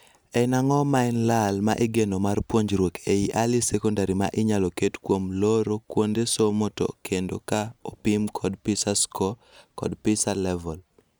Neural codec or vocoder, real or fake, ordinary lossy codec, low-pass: none; real; none; none